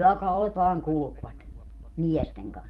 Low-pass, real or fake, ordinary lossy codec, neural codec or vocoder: 19.8 kHz; fake; Opus, 32 kbps; vocoder, 44.1 kHz, 128 mel bands every 256 samples, BigVGAN v2